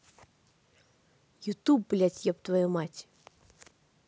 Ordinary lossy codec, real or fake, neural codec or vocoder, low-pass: none; real; none; none